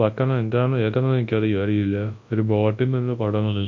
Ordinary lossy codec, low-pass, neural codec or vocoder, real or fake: MP3, 48 kbps; 7.2 kHz; codec, 24 kHz, 0.9 kbps, WavTokenizer, large speech release; fake